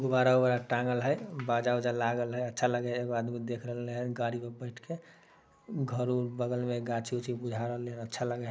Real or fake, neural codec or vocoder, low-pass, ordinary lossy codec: real; none; none; none